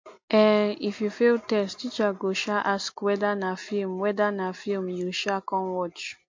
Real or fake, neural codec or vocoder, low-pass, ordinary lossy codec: real; none; 7.2 kHz; MP3, 48 kbps